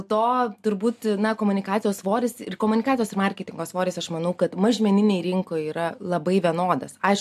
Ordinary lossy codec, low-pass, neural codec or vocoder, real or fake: AAC, 96 kbps; 14.4 kHz; none; real